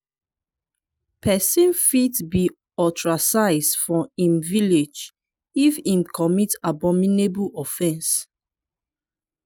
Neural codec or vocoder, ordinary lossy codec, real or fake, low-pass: none; none; real; none